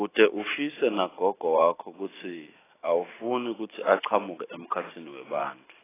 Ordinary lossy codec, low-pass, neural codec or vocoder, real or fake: AAC, 16 kbps; 3.6 kHz; vocoder, 44.1 kHz, 128 mel bands every 512 samples, BigVGAN v2; fake